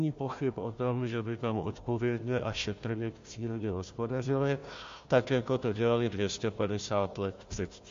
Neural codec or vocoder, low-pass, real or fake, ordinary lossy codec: codec, 16 kHz, 1 kbps, FunCodec, trained on Chinese and English, 50 frames a second; 7.2 kHz; fake; MP3, 48 kbps